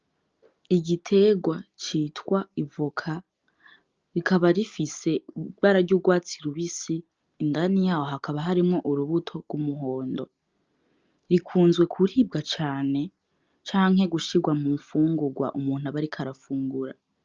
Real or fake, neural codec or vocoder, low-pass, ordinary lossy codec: real; none; 7.2 kHz; Opus, 24 kbps